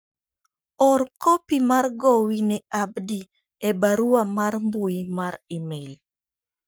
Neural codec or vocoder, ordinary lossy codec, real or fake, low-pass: codec, 44.1 kHz, 7.8 kbps, Pupu-Codec; none; fake; none